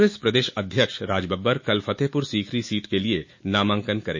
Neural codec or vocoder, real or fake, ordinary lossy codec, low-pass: autoencoder, 48 kHz, 128 numbers a frame, DAC-VAE, trained on Japanese speech; fake; MP3, 32 kbps; 7.2 kHz